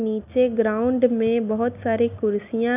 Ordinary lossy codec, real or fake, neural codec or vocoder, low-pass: none; real; none; 3.6 kHz